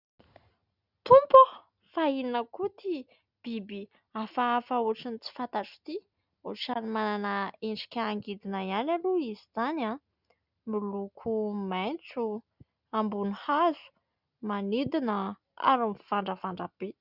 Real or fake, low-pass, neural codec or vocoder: real; 5.4 kHz; none